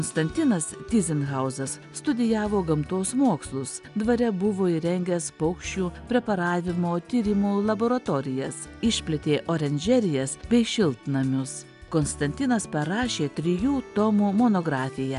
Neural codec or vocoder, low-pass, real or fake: none; 10.8 kHz; real